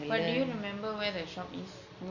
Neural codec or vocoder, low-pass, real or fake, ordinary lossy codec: none; 7.2 kHz; real; none